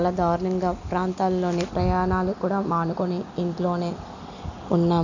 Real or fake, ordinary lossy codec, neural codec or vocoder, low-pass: real; none; none; 7.2 kHz